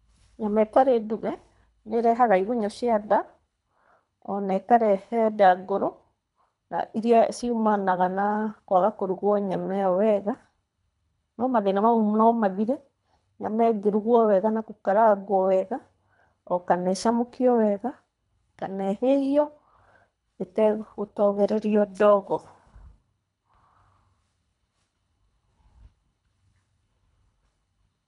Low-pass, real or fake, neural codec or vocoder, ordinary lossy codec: 10.8 kHz; fake; codec, 24 kHz, 3 kbps, HILCodec; none